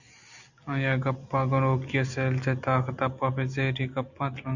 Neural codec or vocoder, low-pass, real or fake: none; 7.2 kHz; real